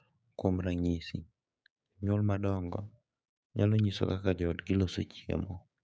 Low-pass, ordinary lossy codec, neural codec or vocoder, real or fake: none; none; codec, 16 kHz, 6 kbps, DAC; fake